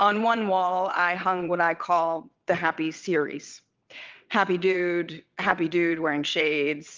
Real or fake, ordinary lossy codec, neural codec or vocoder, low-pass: fake; Opus, 32 kbps; vocoder, 22.05 kHz, 80 mel bands, WaveNeXt; 7.2 kHz